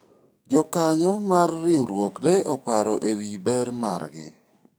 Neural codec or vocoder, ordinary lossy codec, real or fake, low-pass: codec, 44.1 kHz, 2.6 kbps, SNAC; none; fake; none